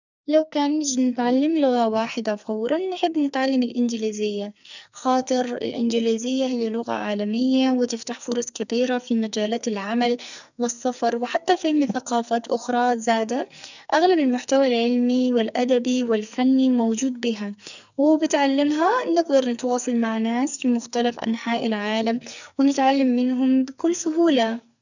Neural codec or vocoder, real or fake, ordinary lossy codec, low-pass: codec, 44.1 kHz, 2.6 kbps, SNAC; fake; none; 7.2 kHz